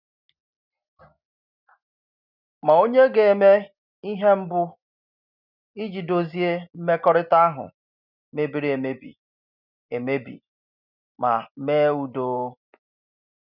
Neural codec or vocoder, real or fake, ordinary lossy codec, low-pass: none; real; none; 5.4 kHz